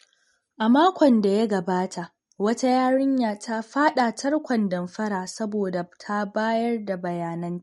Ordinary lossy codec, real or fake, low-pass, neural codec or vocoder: MP3, 48 kbps; real; 19.8 kHz; none